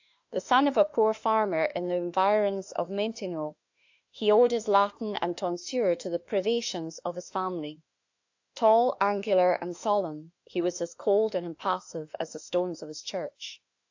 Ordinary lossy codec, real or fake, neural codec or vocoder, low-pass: AAC, 48 kbps; fake; autoencoder, 48 kHz, 32 numbers a frame, DAC-VAE, trained on Japanese speech; 7.2 kHz